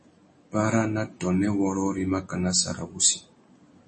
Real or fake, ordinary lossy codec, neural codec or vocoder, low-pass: real; MP3, 32 kbps; none; 10.8 kHz